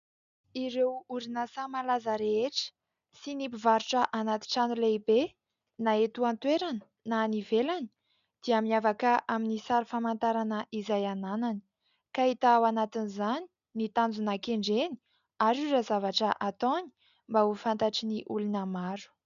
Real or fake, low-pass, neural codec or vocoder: real; 7.2 kHz; none